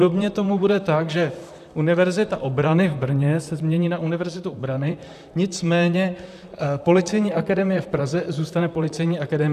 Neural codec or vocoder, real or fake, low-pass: vocoder, 44.1 kHz, 128 mel bands, Pupu-Vocoder; fake; 14.4 kHz